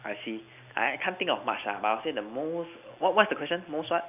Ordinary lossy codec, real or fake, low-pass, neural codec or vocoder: AAC, 32 kbps; real; 3.6 kHz; none